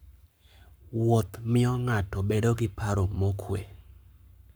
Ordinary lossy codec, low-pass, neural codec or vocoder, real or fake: none; none; codec, 44.1 kHz, 7.8 kbps, Pupu-Codec; fake